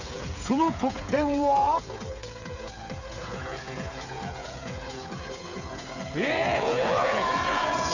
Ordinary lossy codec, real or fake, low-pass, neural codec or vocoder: none; fake; 7.2 kHz; codec, 16 kHz, 8 kbps, FreqCodec, smaller model